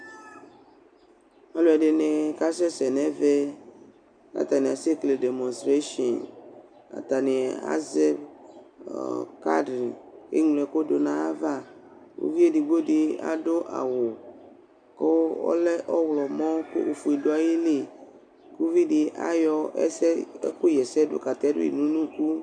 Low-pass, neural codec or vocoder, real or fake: 9.9 kHz; none; real